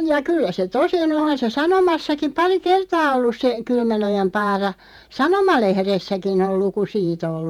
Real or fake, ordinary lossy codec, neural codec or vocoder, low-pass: fake; none; vocoder, 48 kHz, 128 mel bands, Vocos; 19.8 kHz